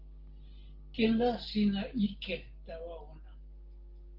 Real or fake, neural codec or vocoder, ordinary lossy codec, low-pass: real; none; Opus, 16 kbps; 5.4 kHz